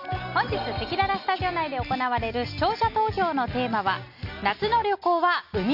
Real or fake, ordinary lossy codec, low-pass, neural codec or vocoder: real; none; 5.4 kHz; none